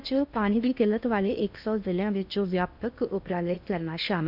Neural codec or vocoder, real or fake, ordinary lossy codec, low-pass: codec, 16 kHz in and 24 kHz out, 0.8 kbps, FocalCodec, streaming, 65536 codes; fake; none; 5.4 kHz